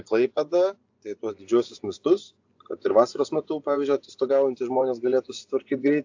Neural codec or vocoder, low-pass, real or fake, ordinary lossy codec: vocoder, 24 kHz, 100 mel bands, Vocos; 7.2 kHz; fake; AAC, 48 kbps